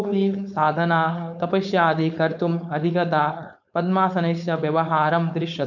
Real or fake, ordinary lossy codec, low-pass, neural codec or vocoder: fake; none; 7.2 kHz; codec, 16 kHz, 4.8 kbps, FACodec